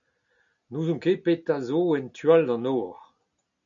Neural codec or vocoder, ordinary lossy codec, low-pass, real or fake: none; MP3, 64 kbps; 7.2 kHz; real